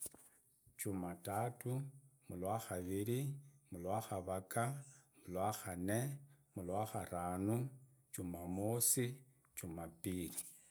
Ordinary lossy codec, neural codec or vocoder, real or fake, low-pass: none; none; real; none